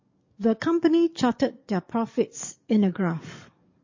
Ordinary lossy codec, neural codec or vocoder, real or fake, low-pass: MP3, 32 kbps; codec, 44.1 kHz, 7.8 kbps, DAC; fake; 7.2 kHz